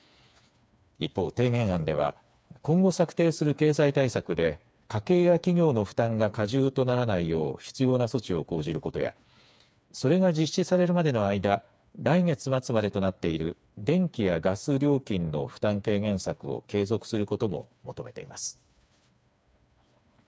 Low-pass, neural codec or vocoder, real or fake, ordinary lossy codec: none; codec, 16 kHz, 4 kbps, FreqCodec, smaller model; fake; none